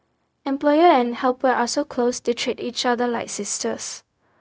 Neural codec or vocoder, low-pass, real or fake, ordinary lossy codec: codec, 16 kHz, 0.4 kbps, LongCat-Audio-Codec; none; fake; none